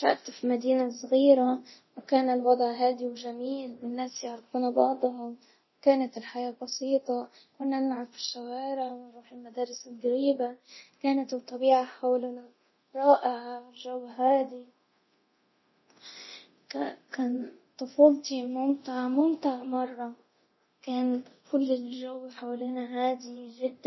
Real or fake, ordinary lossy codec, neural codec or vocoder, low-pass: fake; MP3, 24 kbps; codec, 24 kHz, 0.9 kbps, DualCodec; 7.2 kHz